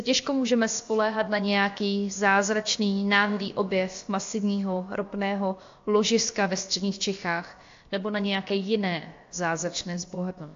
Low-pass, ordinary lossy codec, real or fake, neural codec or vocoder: 7.2 kHz; AAC, 48 kbps; fake; codec, 16 kHz, about 1 kbps, DyCAST, with the encoder's durations